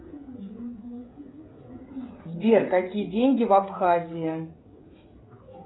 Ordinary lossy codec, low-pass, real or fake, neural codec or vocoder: AAC, 16 kbps; 7.2 kHz; fake; codec, 16 kHz, 16 kbps, FreqCodec, smaller model